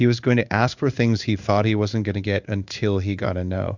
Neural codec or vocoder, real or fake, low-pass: codec, 16 kHz in and 24 kHz out, 1 kbps, XY-Tokenizer; fake; 7.2 kHz